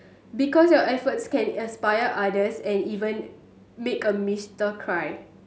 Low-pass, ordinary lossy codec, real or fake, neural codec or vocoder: none; none; real; none